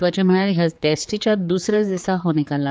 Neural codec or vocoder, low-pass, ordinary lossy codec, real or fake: codec, 16 kHz, 4 kbps, X-Codec, HuBERT features, trained on general audio; none; none; fake